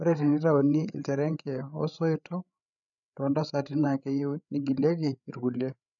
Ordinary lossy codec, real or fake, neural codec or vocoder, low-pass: none; fake; codec, 16 kHz, 8 kbps, FreqCodec, larger model; 7.2 kHz